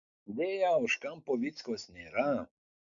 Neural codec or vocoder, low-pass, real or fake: none; 7.2 kHz; real